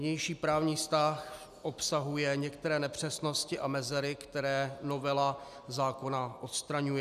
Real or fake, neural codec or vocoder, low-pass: real; none; 14.4 kHz